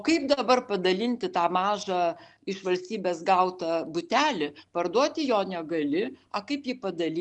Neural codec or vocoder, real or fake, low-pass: none; real; 10.8 kHz